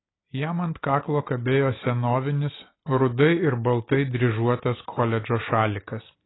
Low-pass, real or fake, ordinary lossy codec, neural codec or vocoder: 7.2 kHz; real; AAC, 16 kbps; none